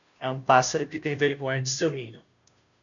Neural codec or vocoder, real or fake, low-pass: codec, 16 kHz, 0.5 kbps, FunCodec, trained on Chinese and English, 25 frames a second; fake; 7.2 kHz